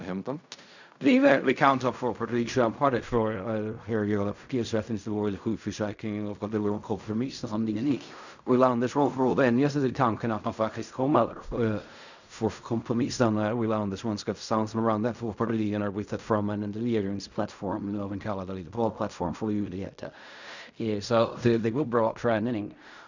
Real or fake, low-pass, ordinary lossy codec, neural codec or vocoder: fake; 7.2 kHz; none; codec, 16 kHz in and 24 kHz out, 0.4 kbps, LongCat-Audio-Codec, fine tuned four codebook decoder